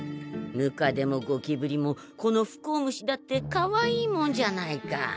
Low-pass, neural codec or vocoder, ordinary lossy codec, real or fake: none; none; none; real